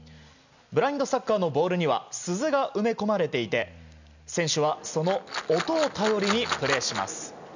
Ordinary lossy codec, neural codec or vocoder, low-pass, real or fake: none; none; 7.2 kHz; real